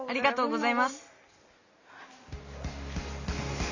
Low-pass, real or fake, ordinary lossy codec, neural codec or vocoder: 7.2 kHz; real; Opus, 64 kbps; none